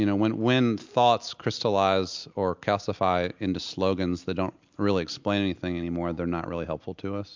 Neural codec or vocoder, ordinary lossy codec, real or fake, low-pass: none; MP3, 64 kbps; real; 7.2 kHz